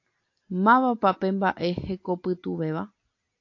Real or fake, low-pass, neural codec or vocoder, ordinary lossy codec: real; 7.2 kHz; none; AAC, 48 kbps